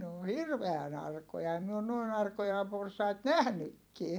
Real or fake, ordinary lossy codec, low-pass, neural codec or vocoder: fake; none; none; vocoder, 44.1 kHz, 128 mel bands every 256 samples, BigVGAN v2